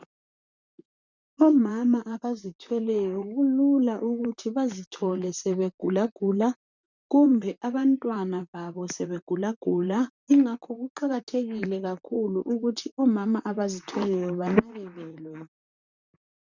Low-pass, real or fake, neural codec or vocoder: 7.2 kHz; fake; vocoder, 44.1 kHz, 128 mel bands, Pupu-Vocoder